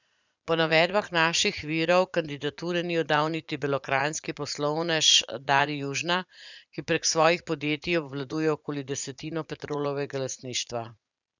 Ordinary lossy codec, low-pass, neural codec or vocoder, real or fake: none; 7.2 kHz; vocoder, 44.1 kHz, 80 mel bands, Vocos; fake